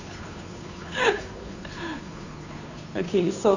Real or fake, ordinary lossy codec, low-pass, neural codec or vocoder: fake; none; 7.2 kHz; codec, 16 kHz, 2 kbps, FunCodec, trained on Chinese and English, 25 frames a second